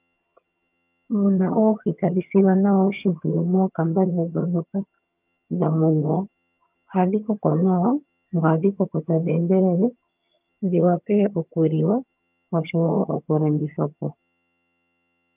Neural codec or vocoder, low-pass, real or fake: vocoder, 22.05 kHz, 80 mel bands, HiFi-GAN; 3.6 kHz; fake